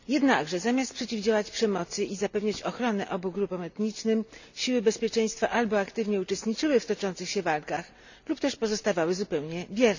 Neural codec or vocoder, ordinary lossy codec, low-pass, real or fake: none; none; 7.2 kHz; real